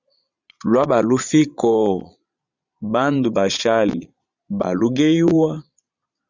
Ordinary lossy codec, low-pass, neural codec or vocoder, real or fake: Opus, 64 kbps; 7.2 kHz; vocoder, 22.05 kHz, 80 mel bands, Vocos; fake